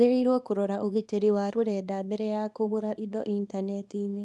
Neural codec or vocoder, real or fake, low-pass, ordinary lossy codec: codec, 24 kHz, 0.9 kbps, WavTokenizer, small release; fake; none; none